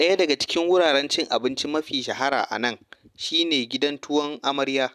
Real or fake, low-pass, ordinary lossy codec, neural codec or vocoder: real; 14.4 kHz; none; none